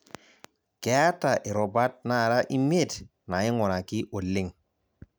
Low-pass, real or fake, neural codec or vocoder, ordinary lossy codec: none; real; none; none